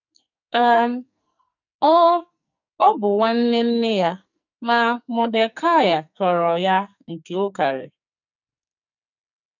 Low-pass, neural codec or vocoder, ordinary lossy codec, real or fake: 7.2 kHz; codec, 44.1 kHz, 2.6 kbps, SNAC; none; fake